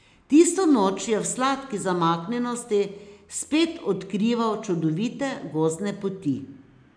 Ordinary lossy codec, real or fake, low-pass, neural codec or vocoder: none; real; 9.9 kHz; none